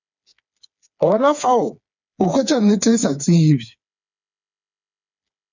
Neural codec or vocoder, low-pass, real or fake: codec, 16 kHz, 4 kbps, FreqCodec, smaller model; 7.2 kHz; fake